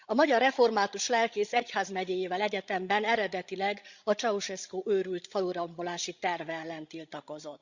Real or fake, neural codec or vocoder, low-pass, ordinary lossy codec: fake; codec, 16 kHz, 16 kbps, FreqCodec, larger model; 7.2 kHz; Opus, 64 kbps